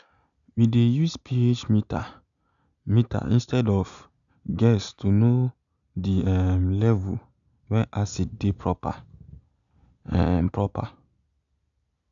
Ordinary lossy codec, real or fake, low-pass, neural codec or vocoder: MP3, 96 kbps; real; 7.2 kHz; none